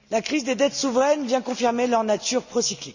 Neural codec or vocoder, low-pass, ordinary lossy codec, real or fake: none; 7.2 kHz; none; real